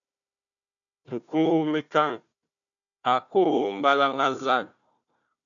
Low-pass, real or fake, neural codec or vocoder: 7.2 kHz; fake; codec, 16 kHz, 1 kbps, FunCodec, trained on Chinese and English, 50 frames a second